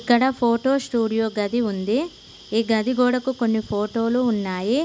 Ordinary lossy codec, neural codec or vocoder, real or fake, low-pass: none; none; real; none